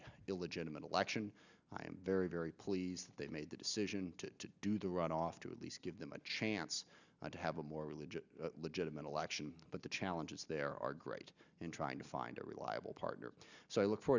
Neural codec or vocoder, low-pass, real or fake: none; 7.2 kHz; real